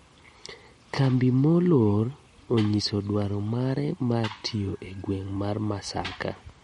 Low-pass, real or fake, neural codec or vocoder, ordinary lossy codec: 19.8 kHz; real; none; MP3, 48 kbps